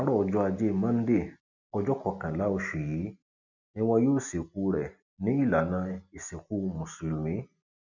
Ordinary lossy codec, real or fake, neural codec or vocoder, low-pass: none; real; none; 7.2 kHz